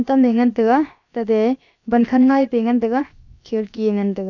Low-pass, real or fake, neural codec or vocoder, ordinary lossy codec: 7.2 kHz; fake; codec, 16 kHz, about 1 kbps, DyCAST, with the encoder's durations; none